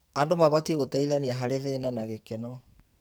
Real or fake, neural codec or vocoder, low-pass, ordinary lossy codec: fake; codec, 44.1 kHz, 2.6 kbps, SNAC; none; none